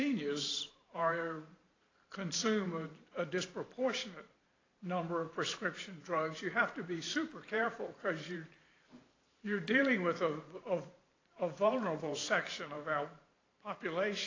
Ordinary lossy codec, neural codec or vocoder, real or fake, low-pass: AAC, 32 kbps; vocoder, 44.1 kHz, 128 mel bands every 512 samples, BigVGAN v2; fake; 7.2 kHz